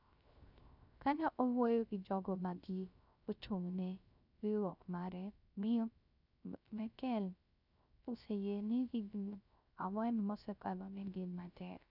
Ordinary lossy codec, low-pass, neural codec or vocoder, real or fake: none; 5.4 kHz; codec, 16 kHz, 0.3 kbps, FocalCodec; fake